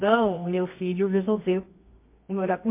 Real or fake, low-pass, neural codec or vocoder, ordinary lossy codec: fake; 3.6 kHz; codec, 24 kHz, 0.9 kbps, WavTokenizer, medium music audio release; MP3, 24 kbps